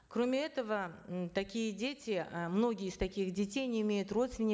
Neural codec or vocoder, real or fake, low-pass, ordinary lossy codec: none; real; none; none